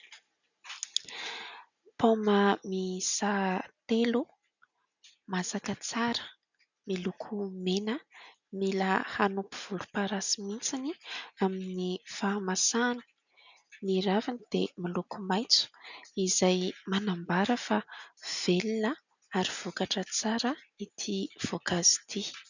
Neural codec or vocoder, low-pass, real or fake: none; 7.2 kHz; real